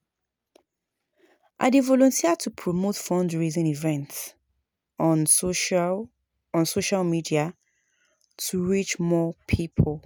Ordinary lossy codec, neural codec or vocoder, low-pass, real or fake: none; none; none; real